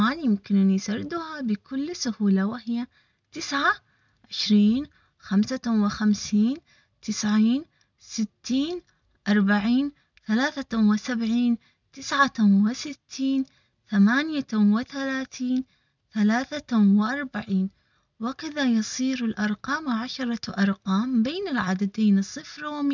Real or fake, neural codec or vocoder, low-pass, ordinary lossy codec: real; none; 7.2 kHz; none